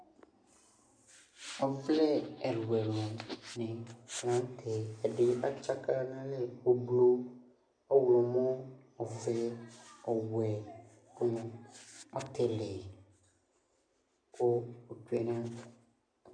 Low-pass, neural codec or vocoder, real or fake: 9.9 kHz; none; real